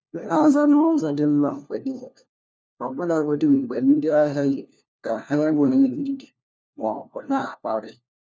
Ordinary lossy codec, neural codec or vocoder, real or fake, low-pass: none; codec, 16 kHz, 1 kbps, FunCodec, trained on LibriTTS, 50 frames a second; fake; none